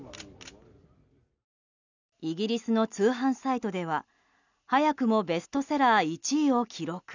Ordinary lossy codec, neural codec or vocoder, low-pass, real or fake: none; none; 7.2 kHz; real